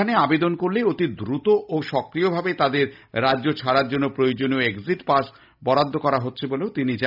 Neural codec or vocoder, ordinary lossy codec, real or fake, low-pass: none; none; real; 5.4 kHz